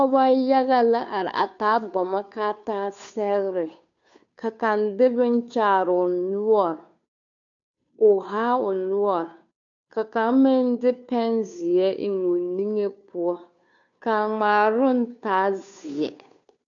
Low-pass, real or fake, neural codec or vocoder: 7.2 kHz; fake; codec, 16 kHz, 2 kbps, FunCodec, trained on Chinese and English, 25 frames a second